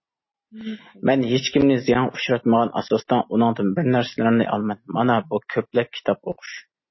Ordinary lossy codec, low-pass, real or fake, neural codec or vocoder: MP3, 24 kbps; 7.2 kHz; real; none